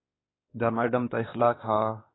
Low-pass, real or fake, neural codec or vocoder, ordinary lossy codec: 7.2 kHz; fake; codec, 16 kHz, 2 kbps, X-Codec, WavLM features, trained on Multilingual LibriSpeech; AAC, 16 kbps